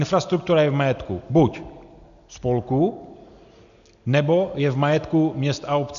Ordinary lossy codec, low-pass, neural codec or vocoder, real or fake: MP3, 96 kbps; 7.2 kHz; none; real